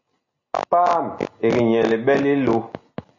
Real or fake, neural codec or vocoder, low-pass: real; none; 7.2 kHz